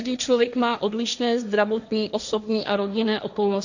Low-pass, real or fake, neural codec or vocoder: 7.2 kHz; fake; codec, 16 kHz, 1.1 kbps, Voila-Tokenizer